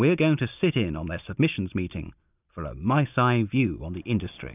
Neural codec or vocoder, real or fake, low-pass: none; real; 3.6 kHz